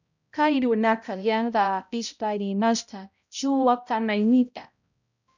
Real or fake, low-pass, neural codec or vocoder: fake; 7.2 kHz; codec, 16 kHz, 0.5 kbps, X-Codec, HuBERT features, trained on balanced general audio